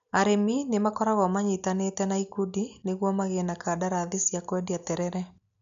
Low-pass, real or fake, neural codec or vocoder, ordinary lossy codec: 7.2 kHz; real; none; MP3, 64 kbps